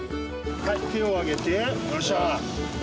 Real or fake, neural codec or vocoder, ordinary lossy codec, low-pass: real; none; none; none